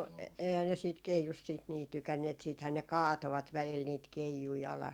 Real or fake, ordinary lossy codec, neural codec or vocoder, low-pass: fake; Opus, 64 kbps; codec, 44.1 kHz, 7.8 kbps, DAC; 19.8 kHz